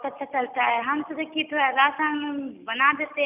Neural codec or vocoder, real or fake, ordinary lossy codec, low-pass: none; real; none; 3.6 kHz